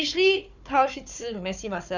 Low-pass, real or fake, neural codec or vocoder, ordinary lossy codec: 7.2 kHz; fake; codec, 16 kHz, 4 kbps, FunCodec, trained on Chinese and English, 50 frames a second; none